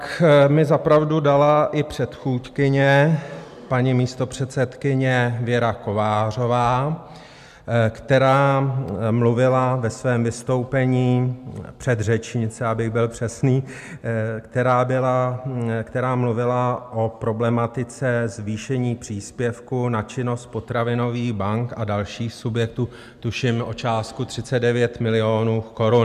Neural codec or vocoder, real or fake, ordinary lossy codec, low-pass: none; real; MP3, 96 kbps; 14.4 kHz